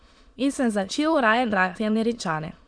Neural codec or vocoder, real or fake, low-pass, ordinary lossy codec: autoencoder, 22.05 kHz, a latent of 192 numbers a frame, VITS, trained on many speakers; fake; 9.9 kHz; MP3, 64 kbps